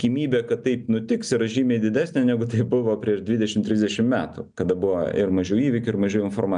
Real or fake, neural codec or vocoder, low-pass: real; none; 9.9 kHz